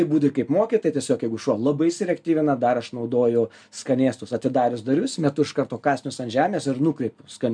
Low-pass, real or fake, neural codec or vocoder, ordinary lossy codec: 9.9 kHz; real; none; MP3, 96 kbps